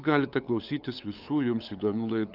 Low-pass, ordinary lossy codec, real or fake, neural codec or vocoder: 5.4 kHz; Opus, 24 kbps; fake; codec, 16 kHz, 8 kbps, FunCodec, trained on LibriTTS, 25 frames a second